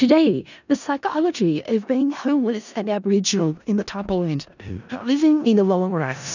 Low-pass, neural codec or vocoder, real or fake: 7.2 kHz; codec, 16 kHz in and 24 kHz out, 0.4 kbps, LongCat-Audio-Codec, four codebook decoder; fake